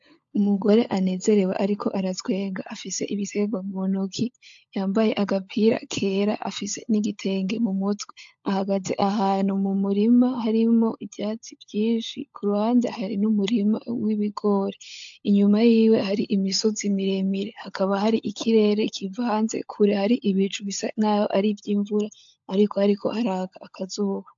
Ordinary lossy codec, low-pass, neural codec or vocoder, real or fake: AAC, 64 kbps; 7.2 kHz; codec, 16 kHz, 16 kbps, FunCodec, trained on LibriTTS, 50 frames a second; fake